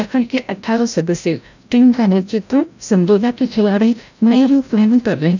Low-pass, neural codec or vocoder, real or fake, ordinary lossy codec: 7.2 kHz; codec, 16 kHz, 0.5 kbps, FreqCodec, larger model; fake; none